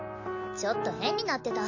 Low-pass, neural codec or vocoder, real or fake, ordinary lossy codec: 7.2 kHz; none; real; none